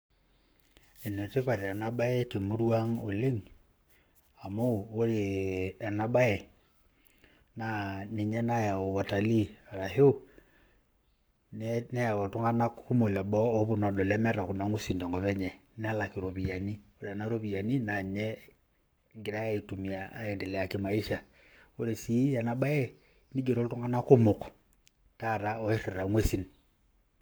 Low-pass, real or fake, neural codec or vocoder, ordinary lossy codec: none; fake; codec, 44.1 kHz, 7.8 kbps, Pupu-Codec; none